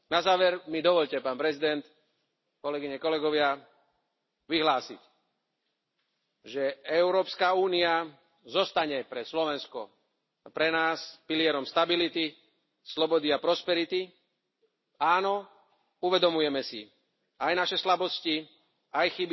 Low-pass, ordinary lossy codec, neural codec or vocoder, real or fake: 7.2 kHz; MP3, 24 kbps; none; real